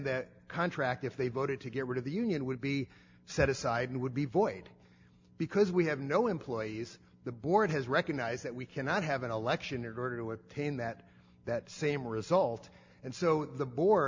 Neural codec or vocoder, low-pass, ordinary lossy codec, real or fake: none; 7.2 kHz; MP3, 64 kbps; real